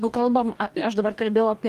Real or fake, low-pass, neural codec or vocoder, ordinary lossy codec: fake; 14.4 kHz; codec, 44.1 kHz, 2.6 kbps, DAC; Opus, 32 kbps